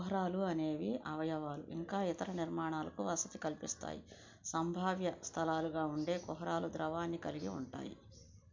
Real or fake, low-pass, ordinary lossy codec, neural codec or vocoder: real; 7.2 kHz; MP3, 64 kbps; none